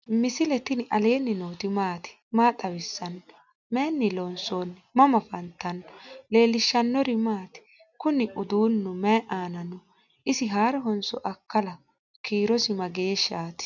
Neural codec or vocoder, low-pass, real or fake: none; 7.2 kHz; real